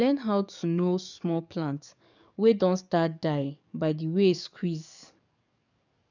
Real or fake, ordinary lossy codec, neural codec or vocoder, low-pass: fake; none; codec, 44.1 kHz, 7.8 kbps, DAC; 7.2 kHz